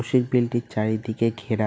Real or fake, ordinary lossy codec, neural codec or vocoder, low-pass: real; none; none; none